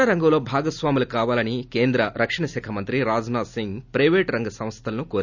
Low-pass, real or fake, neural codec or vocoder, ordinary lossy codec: 7.2 kHz; real; none; none